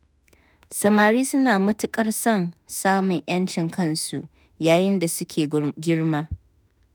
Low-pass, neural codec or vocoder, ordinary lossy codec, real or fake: none; autoencoder, 48 kHz, 32 numbers a frame, DAC-VAE, trained on Japanese speech; none; fake